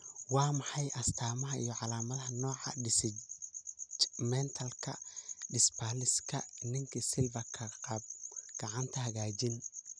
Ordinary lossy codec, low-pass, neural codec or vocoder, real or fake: none; 9.9 kHz; none; real